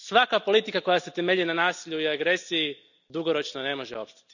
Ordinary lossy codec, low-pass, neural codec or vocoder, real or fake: none; 7.2 kHz; none; real